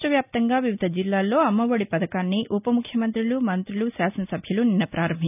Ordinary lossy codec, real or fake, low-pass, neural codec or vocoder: none; real; 3.6 kHz; none